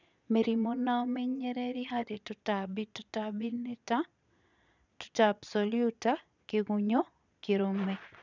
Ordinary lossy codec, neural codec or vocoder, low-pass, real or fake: none; vocoder, 22.05 kHz, 80 mel bands, Vocos; 7.2 kHz; fake